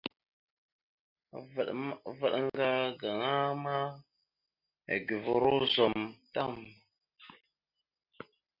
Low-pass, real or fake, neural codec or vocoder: 5.4 kHz; real; none